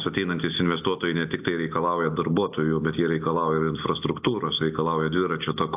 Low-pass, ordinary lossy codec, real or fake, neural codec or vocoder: 3.6 kHz; AAC, 32 kbps; real; none